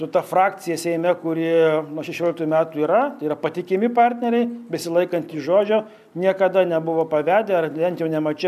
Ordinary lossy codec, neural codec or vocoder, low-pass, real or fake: AAC, 96 kbps; none; 14.4 kHz; real